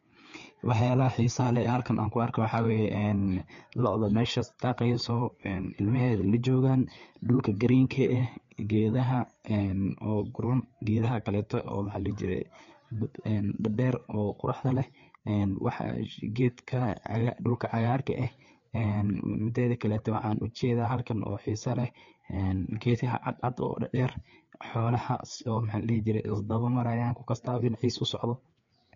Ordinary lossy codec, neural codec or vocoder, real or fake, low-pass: MP3, 48 kbps; codec, 16 kHz, 4 kbps, FreqCodec, larger model; fake; 7.2 kHz